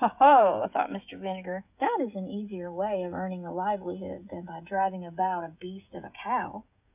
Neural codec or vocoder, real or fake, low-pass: codec, 16 kHz, 16 kbps, FreqCodec, smaller model; fake; 3.6 kHz